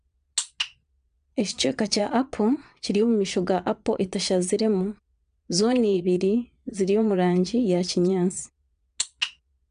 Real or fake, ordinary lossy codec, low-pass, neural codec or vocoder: fake; AAC, 96 kbps; 9.9 kHz; vocoder, 22.05 kHz, 80 mel bands, Vocos